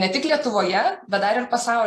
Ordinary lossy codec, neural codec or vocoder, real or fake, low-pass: AAC, 48 kbps; none; real; 14.4 kHz